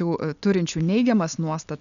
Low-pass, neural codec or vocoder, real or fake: 7.2 kHz; none; real